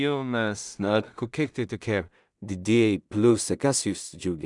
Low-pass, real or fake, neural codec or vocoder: 10.8 kHz; fake; codec, 16 kHz in and 24 kHz out, 0.4 kbps, LongCat-Audio-Codec, two codebook decoder